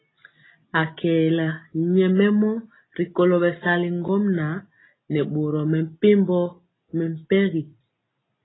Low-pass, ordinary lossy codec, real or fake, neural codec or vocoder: 7.2 kHz; AAC, 16 kbps; real; none